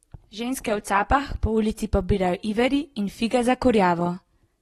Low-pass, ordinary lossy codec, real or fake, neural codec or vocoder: 19.8 kHz; AAC, 32 kbps; real; none